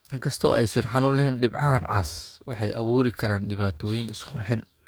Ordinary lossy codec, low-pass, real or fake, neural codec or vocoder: none; none; fake; codec, 44.1 kHz, 2.6 kbps, DAC